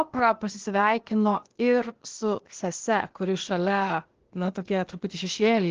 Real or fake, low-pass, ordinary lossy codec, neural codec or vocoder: fake; 7.2 kHz; Opus, 16 kbps; codec, 16 kHz, 0.8 kbps, ZipCodec